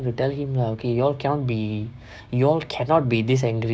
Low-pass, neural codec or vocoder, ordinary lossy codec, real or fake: none; codec, 16 kHz, 6 kbps, DAC; none; fake